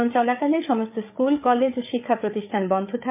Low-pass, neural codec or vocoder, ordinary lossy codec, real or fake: 3.6 kHz; codec, 16 kHz, 8 kbps, FreqCodec, larger model; MP3, 32 kbps; fake